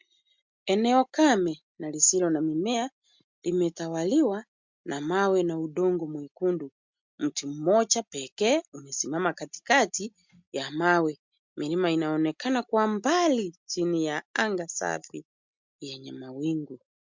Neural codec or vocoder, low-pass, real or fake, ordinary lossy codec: none; 7.2 kHz; real; MP3, 64 kbps